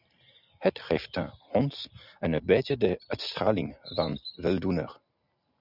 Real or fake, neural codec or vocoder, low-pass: real; none; 5.4 kHz